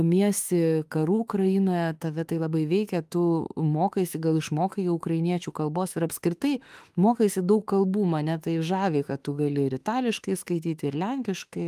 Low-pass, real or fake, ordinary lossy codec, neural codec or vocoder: 14.4 kHz; fake; Opus, 32 kbps; autoencoder, 48 kHz, 32 numbers a frame, DAC-VAE, trained on Japanese speech